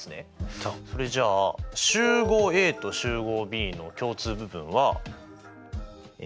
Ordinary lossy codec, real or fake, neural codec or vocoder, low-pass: none; real; none; none